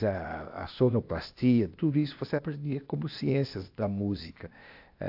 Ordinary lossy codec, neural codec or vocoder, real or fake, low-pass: AAC, 48 kbps; codec, 16 kHz, 0.8 kbps, ZipCodec; fake; 5.4 kHz